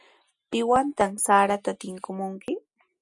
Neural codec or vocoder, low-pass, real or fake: none; 10.8 kHz; real